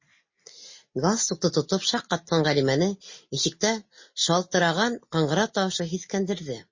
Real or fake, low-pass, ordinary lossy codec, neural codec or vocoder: real; 7.2 kHz; MP3, 32 kbps; none